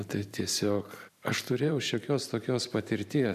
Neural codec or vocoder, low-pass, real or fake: vocoder, 44.1 kHz, 128 mel bands every 512 samples, BigVGAN v2; 14.4 kHz; fake